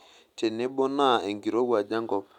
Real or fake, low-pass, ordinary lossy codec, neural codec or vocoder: real; 19.8 kHz; none; none